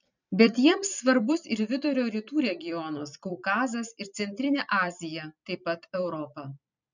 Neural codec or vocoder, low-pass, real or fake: vocoder, 44.1 kHz, 128 mel bands every 256 samples, BigVGAN v2; 7.2 kHz; fake